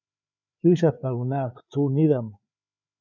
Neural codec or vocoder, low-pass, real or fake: codec, 16 kHz, 4 kbps, FreqCodec, larger model; 7.2 kHz; fake